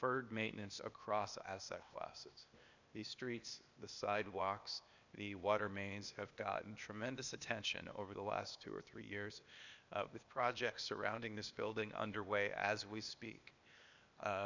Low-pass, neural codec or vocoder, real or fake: 7.2 kHz; codec, 16 kHz, 0.8 kbps, ZipCodec; fake